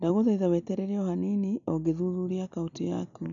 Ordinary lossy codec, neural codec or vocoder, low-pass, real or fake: none; none; 7.2 kHz; real